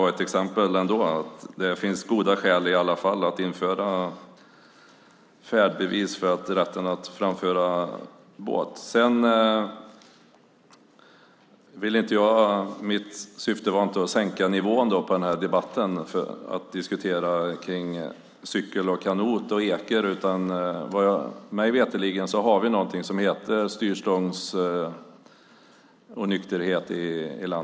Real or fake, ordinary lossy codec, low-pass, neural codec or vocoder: real; none; none; none